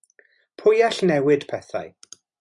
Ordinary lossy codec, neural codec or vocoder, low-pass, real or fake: MP3, 96 kbps; none; 10.8 kHz; real